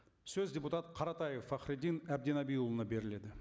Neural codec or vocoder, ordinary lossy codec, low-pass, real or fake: none; none; none; real